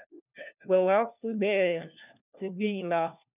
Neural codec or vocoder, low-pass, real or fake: codec, 16 kHz, 1 kbps, FunCodec, trained on LibriTTS, 50 frames a second; 3.6 kHz; fake